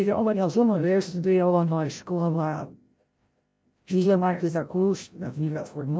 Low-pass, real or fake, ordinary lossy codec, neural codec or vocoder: none; fake; none; codec, 16 kHz, 0.5 kbps, FreqCodec, larger model